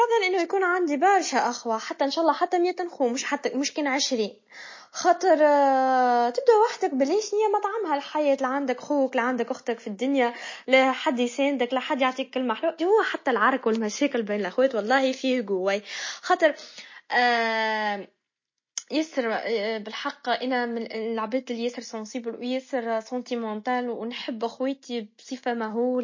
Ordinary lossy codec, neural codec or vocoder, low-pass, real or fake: MP3, 32 kbps; none; 7.2 kHz; real